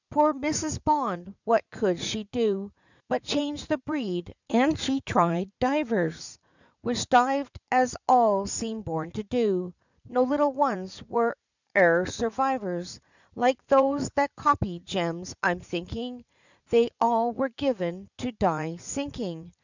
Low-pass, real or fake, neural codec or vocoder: 7.2 kHz; fake; vocoder, 44.1 kHz, 80 mel bands, Vocos